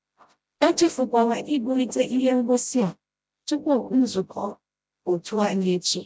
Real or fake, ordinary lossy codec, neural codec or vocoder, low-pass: fake; none; codec, 16 kHz, 0.5 kbps, FreqCodec, smaller model; none